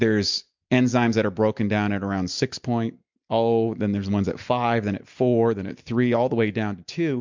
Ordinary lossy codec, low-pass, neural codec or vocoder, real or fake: MP3, 64 kbps; 7.2 kHz; none; real